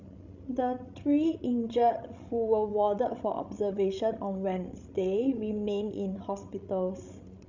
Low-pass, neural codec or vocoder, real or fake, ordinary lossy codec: 7.2 kHz; codec, 16 kHz, 16 kbps, FreqCodec, larger model; fake; none